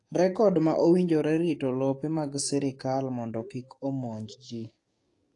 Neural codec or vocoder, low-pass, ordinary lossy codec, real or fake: codec, 44.1 kHz, 7.8 kbps, DAC; 10.8 kHz; AAC, 48 kbps; fake